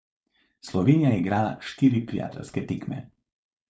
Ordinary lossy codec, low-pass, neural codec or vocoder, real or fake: none; none; codec, 16 kHz, 4.8 kbps, FACodec; fake